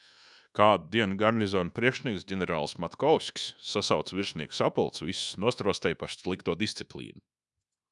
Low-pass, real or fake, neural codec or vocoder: 10.8 kHz; fake; codec, 24 kHz, 1.2 kbps, DualCodec